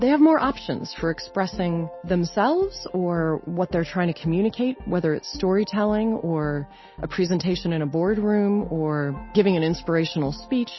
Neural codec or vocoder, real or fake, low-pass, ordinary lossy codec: none; real; 7.2 kHz; MP3, 24 kbps